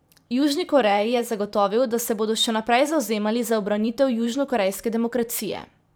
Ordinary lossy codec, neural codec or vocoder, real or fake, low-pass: none; vocoder, 44.1 kHz, 128 mel bands every 512 samples, BigVGAN v2; fake; none